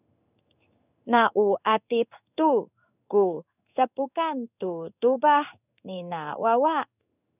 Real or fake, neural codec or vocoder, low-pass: fake; codec, 16 kHz in and 24 kHz out, 1 kbps, XY-Tokenizer; 3.6 kHz